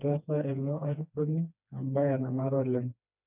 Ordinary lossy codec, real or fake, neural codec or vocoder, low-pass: none; fake; codec, 16 kHz, 2 kbps, FreqCodec, smaller model; 3.6 kHz